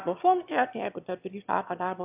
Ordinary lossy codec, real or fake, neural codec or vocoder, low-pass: AAC, 32 kbps; fake; autoencoder, 22.05 kHz, a latent of 192 numbers a frame, VITS, trained on one speaker; 3.6 kHz